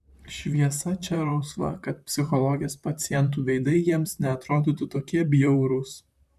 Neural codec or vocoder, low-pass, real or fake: vocoder, 44.1 kHz, 128 mel bands, Pupu-Vocoder; 14.4 kHz; fake